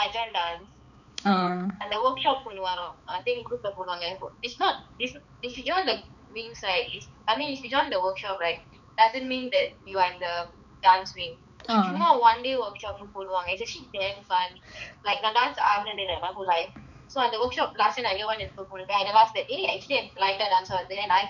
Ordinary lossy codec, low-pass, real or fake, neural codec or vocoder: none; 7.2 kHz; fake; codec, 16 kHz, 4 kbps, X-Codec, HuBERT features, trained on balanced general audio